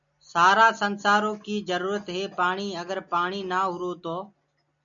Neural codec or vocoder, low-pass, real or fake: none; 7.2 kHz; real